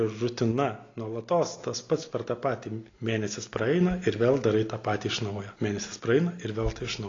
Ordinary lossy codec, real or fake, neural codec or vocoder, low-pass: AAC, 32 kbps; real; none; 7.2 kHz